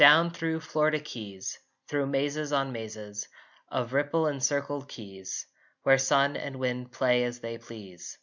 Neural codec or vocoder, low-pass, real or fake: none; 7.2 kHz; real